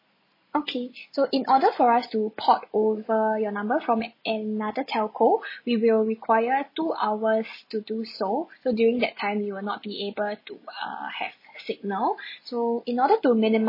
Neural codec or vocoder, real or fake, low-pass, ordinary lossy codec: none; real; 5.4 kHz; MP3, 24 kbps